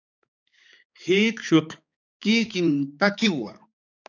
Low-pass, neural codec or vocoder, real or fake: 7.2 kHz; codec, 16 kHz, 4 kbps, X-Codec, HuBERT features, trained on balanced general audio; fake